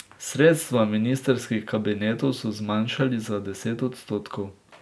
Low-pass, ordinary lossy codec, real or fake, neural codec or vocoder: none; none; real; none